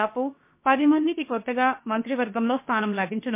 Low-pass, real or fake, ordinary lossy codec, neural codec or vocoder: 3.6 kHz; fake; MP3, 24 kbps; codec, 16 kHz, about 1 kbps, DyCAST, with the encoder's durations